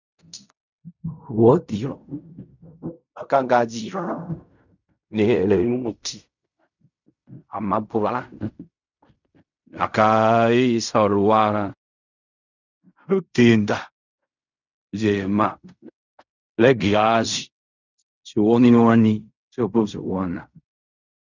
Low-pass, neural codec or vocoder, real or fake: 7.2 kHz; codec, 16 kHz in and 24 kHz out, 0.4 kbps, LongCat-Audio-Codec, fine tuned four codebook decoder; fake